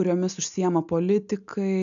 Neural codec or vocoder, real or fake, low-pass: none; real; 7.2 kHz